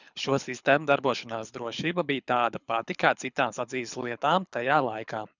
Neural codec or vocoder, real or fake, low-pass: codec, 24 kHz, 6 kbps, HILCodec; fake; 7.2 kHz